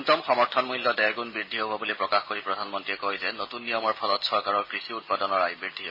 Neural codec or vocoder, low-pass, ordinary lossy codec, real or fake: none; 5.4 kHz; MP3, 24 kbps; real